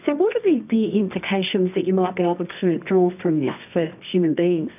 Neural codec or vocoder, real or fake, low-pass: codec, 24 kHz, 0.9 kbps, WavTokenizer, medium music audio release; fake; 3.6 kHz